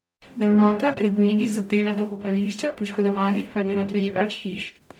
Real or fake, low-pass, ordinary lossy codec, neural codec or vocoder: fake; 19.8 kHz; none; codec, 44.1 kHz, 0.9 kbps, DAC